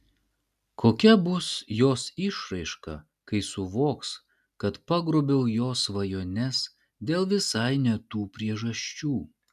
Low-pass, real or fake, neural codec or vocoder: 14.4 kHz; real; none